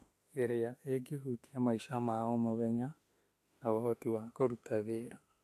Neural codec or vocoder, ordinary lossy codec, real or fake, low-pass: autoencoder, 48 kHz, 32 numbers a frame, DAC-VAE, trained on Japanese speech; AAC, 64 kbps; fake; 14.4 kHz